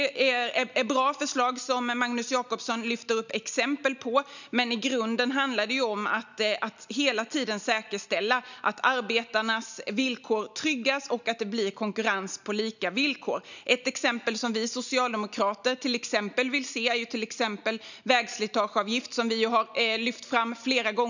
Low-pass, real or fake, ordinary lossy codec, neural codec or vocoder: 7.2 kHz; real; none; none